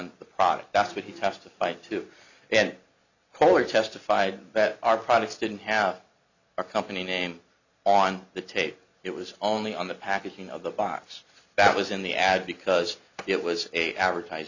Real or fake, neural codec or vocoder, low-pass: real; none; 7.2 kHz